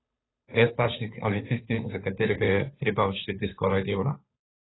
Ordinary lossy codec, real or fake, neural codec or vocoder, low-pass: AAC, 16 kbps; fake; codec, 16 kHz, 2 kbps, FunCodec, trained on Chinese and English, 25 frames a second; 7.2 kHz